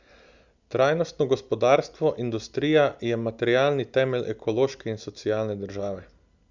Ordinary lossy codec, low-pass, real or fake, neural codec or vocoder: Opus, 64 kbps; 7.2 kHz; real; none